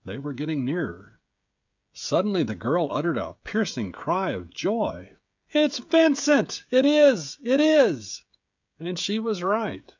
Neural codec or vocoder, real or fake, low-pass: codec, 16 kHz, 16 kbps, FreqCodec, smaller model; fake; 7.2 kHz